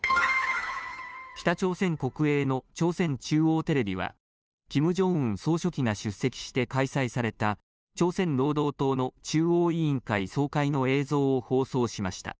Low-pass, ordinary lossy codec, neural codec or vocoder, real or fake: none; none; codec, 16 kHz, 2 kbps, FunCodec, trained on Chinese and English, 25 frames a second; fake